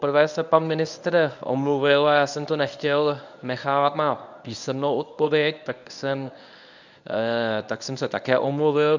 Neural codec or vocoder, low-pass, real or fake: codec, 24 kHz, 0.9 kbps, WavTokenizer, medium speech release version 1; 7.2 kHz; fake